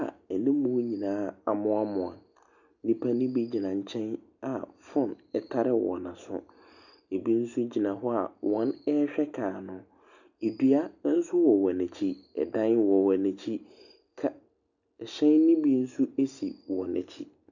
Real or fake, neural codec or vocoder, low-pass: real; none; 7.2 kHz